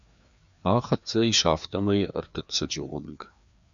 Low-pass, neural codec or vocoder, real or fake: 7.2 kHz; codec, 16 kHz, 2 kbps, FreqCodec, larger model; fake